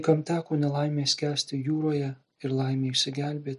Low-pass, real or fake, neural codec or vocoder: 10.8 kHz; real; none